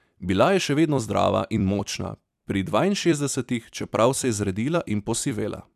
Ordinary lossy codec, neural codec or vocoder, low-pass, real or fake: none; vocoder, 44.1 kHz, 128 mel bands every 256 samples, BigVGAN v2; 14.4 kHz; fake